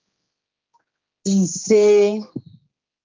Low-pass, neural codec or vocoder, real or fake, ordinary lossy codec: 7.2 kHz; codec, 16 kHz, 2 kbps, X-Codec, HuBERT features, trained on general audio; fake; Opus, 24 kbps